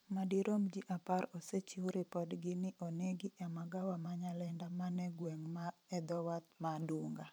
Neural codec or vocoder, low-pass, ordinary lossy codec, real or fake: vocoder, 44.1 kHz, 128 mel bands every 512 samples, BigVGAN v2; none; none; fake